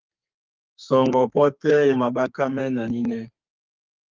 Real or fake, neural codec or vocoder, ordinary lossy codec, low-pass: fake; codec, 32 kHz, 1.9 kbps, SNAC; Opus, 24 kbps; 7.2 kHz